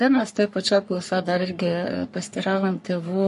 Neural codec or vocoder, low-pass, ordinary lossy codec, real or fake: codec, 44.1 kHz, 3.4 kbps, Pupu-Codec; 14.4 kHz; MP3, 48 kbps; fake